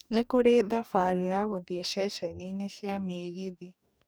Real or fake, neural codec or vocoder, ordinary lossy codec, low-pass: fake; codec, 44.1 kHz, 2.6 kbps, DAC; none; none